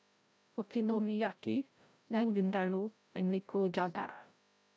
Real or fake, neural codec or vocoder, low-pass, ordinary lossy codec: fake; codec, 16 kHz, 0.5 kbps, FreqCodec, larger model; none; none